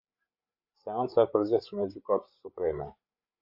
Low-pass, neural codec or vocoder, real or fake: 5.4 kHz; codec, 16 kHz, 8 kbps, FreqCodec, larger model; fake